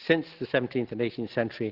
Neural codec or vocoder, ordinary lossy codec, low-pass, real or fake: none; Opus, 16 kbps; 5.4 kHz; real